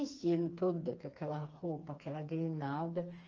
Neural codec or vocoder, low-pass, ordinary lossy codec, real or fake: codec, 16 kHz, 4 kbps, FreqCodec, smaller model; 7.2 kHz; Opus, 24 kbps; fake